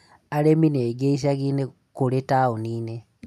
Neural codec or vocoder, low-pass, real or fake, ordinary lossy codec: none; 14.4 kHz; real; none